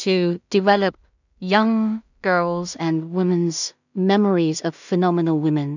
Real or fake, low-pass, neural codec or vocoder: fake; 7.2 kHz; codec, 16 kHz in and 24 kHz out, 0.4 kbps, LongCat-Audio-Codec, two codebook decoder